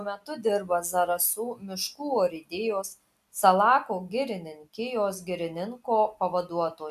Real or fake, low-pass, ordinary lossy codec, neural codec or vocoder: real; 14.4 kHz; AAC, 96 kbps; none